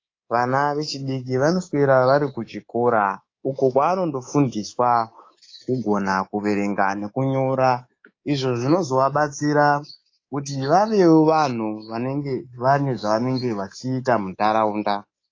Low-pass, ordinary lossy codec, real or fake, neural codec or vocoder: 7.2 kHz; AAC, 32 kbps; fake; codec, 24 kHz, 3.1 kbps, DualCodec